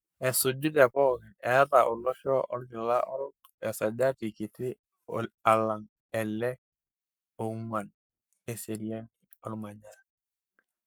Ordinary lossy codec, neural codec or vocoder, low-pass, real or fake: none; codec, 44.1 kHz, 7.8 kbps, Pupu-Codec; none; fake